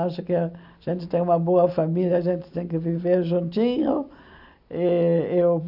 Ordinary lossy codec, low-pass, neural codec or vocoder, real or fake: none; 5.4 kHz; none; real